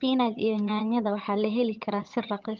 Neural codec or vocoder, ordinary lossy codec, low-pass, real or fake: vocoder, 22.05 kHz, 80 mel bands, HiFi-GAN; Opus, 24 kbps; 7.2 kHz; fake